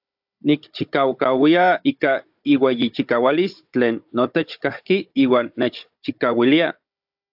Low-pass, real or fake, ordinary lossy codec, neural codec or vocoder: 5.4 kHz; fake; AAC, 48 kbps; codec, 16 kHz, 16 kbps, FunCodec, trained on Chinese and English, 50 frames a second